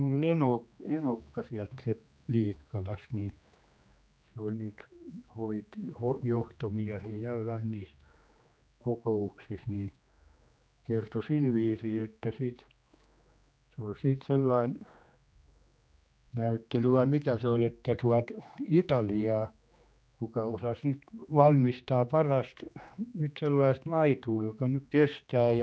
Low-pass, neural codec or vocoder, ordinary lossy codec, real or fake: none; codec, 16 kHz, 2 kbps, X-Codec, HuBERT features, trained on general audio; none; fake